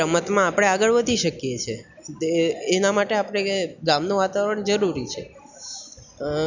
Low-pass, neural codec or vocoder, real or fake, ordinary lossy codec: 7.2 kHz; none; real; none